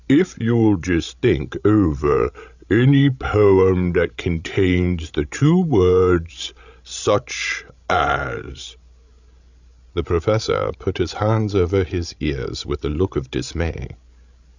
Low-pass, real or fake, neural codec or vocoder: 7.2 kHz; fake; codec, 16 kHz, 16 kbps, FreqCodec, larger model